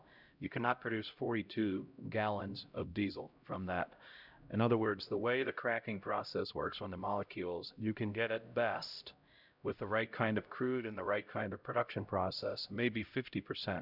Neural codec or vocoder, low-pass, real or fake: codec, 16 kHz, 0.5 kbps, X-Codec, HuBERT features, trained on LibriSpeech; 5.4 kHz; fake